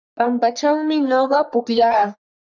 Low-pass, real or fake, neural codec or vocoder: 7.2 kHz; fake; codec, 44.1 kHz, 3.4 kbps, Pupu-Codec